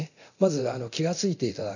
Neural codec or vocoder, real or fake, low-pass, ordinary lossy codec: codec, 24 kHz, 0.9 kbps, DualCodec; fake; 7.2 kHz; none